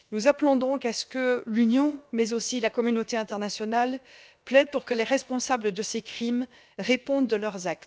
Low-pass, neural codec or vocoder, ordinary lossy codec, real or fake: none; codec, 16 kHz, about 1 kbps, DyCAST, with the encoder's durations; none; fake